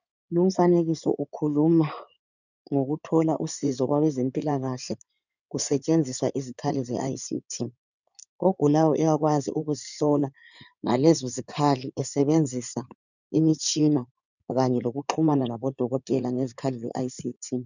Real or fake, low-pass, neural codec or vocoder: fake; 7.2 kHz; codec, 16 kHz in and 24 kHz out, 2.2 kbps, FireRedTTS-2 codec